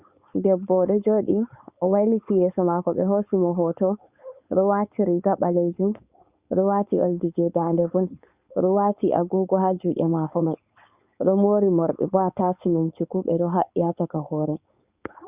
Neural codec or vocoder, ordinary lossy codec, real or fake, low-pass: codec, 16 kHz, 4.8 kbps, FACodec; Opus, 64 kbps; fake; 3.6 kHz